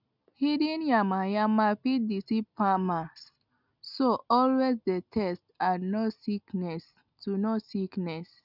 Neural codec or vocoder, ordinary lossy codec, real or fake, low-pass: none; none; real; 5.4 kHz